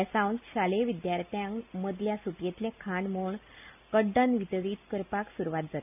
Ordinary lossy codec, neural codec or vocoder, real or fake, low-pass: none; none; real; 3.6 kHz